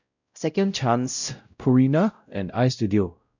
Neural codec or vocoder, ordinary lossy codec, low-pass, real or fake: codec, 16 kHz, 0.5 kbps, X-Codec, WavLM features, trained on Multilingual LibriSpeech; none; 7.2 kHz; fake